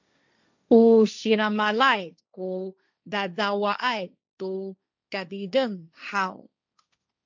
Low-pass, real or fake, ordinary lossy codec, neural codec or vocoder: 7.2 kHz; fake; MP3, 64 kbps; codec, 16 kHz, 1.1 kbps, Voila-Tokenizer